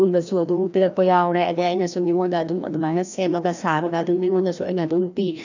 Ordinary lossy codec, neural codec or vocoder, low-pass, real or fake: none; codec, 16 kHz, 1 kbps, FreqCodec, larger model; 7.2 kHz; fake